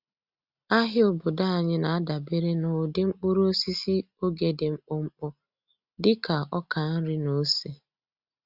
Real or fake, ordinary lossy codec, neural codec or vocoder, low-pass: real; Opus, 64 kbps; none; 5.4 kHz